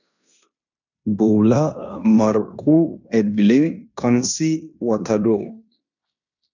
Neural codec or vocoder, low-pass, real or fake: codec, 16 kHz in and 24 kHz out, 0.9 kbps, LongCat-Audio-Codec, fine tuned four codebook decoder; 7.2 kHz; fake